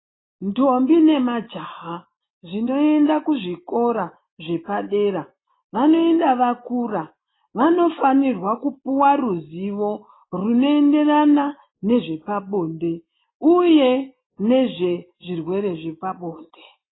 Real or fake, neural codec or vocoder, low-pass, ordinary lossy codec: real; none; 7.2 kHz; AAC, 16 kbps